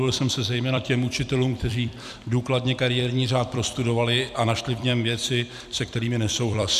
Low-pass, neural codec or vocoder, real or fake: 14.4 kHz; vocoder, 44.1 kHz, 128 mel bands every 256 samples, BigVGAN v2; fake